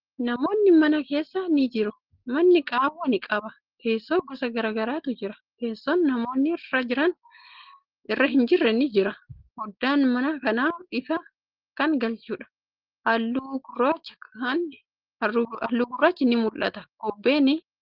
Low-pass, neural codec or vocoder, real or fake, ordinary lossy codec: 5.4 kHz; none; real; Opus, 16 kbps